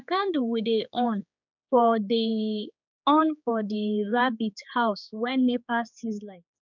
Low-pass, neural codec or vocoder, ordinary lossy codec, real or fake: 7.2 kHz; codec, 16 kHz, 4 kbps, X-Codec, HuBERT features, trained on general audio; none; fake